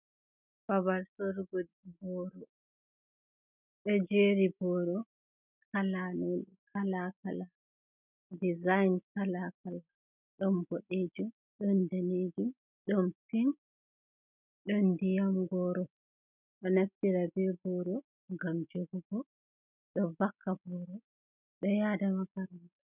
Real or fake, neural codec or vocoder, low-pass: real; none; 3.6 kHz